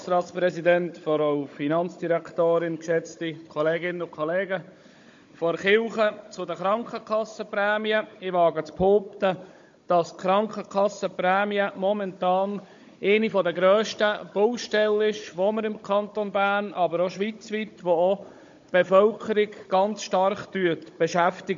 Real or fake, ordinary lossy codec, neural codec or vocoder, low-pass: fake; MP3, 48 kbps; codec, 16 kHz, 16 kbps, FunCodec, trained on Chinese and English, 50 frames a second; 7.2 kHz